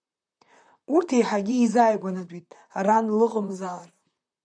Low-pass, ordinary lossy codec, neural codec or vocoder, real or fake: 9.9 kHz; MP3, 96 kbps; vocoder, 44.1 kHz, 128 mel bands, Pupu-Vocoder; fake